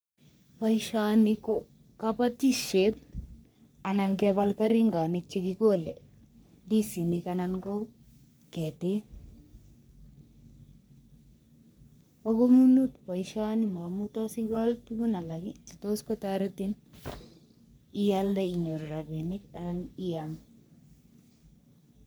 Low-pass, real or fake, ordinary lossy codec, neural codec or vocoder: none; fake; none; codec, 44.1 kHz, 3.4 kbps, Pupu-Codec